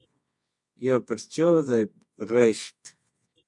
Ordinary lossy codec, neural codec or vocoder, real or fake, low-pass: MP3, 64 kbps; codec, 24 kHz, 0.9 kbps, WavTokenizer, medium music audio release; fake; 10.8 kHz